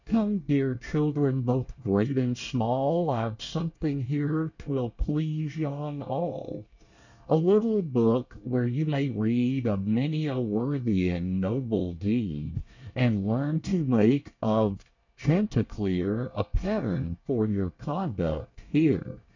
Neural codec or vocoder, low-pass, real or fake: codec, 24 kHz, 1 kbps, SNAC; 7.2 kHz; fake